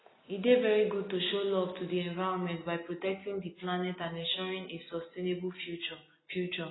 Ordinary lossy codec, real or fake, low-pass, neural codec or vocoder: AAC, 16 kbps; real; 7.2 kHz; none